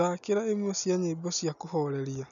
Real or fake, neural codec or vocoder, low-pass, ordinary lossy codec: real; none; 7.2 kHz; none